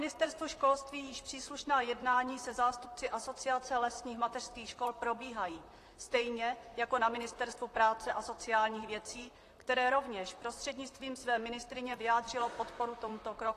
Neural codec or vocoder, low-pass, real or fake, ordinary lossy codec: vocoder, 44.1 kHz, 128 mel bands, Pupu-Vocoder; 14.4 kHz; fake; AAC, 48 kbps